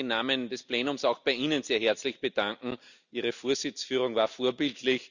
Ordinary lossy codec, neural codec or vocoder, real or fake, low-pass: none; none; real; 7.2 kHz